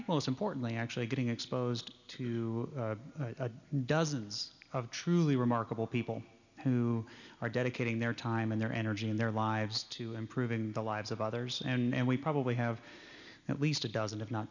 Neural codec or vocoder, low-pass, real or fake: none; 7.2 kHz; real